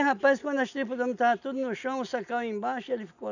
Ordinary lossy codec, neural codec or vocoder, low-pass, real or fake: none; none; 7.2 kHz; real